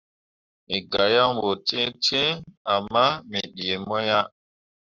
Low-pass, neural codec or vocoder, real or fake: 7.2 kHz; codec, 44.1 kHz, 7.8 kbps, Pupu-Codec; fake